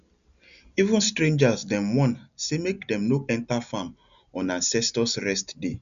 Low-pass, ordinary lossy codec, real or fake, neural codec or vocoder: 7.2 kHz; none; real; none